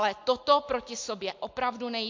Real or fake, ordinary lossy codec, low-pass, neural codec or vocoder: real; MP3, 48 kbps; 7.2 kHz; none